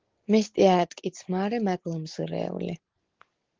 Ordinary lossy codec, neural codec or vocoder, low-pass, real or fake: Opus, 16 kbps; none; 7.2 kHz; real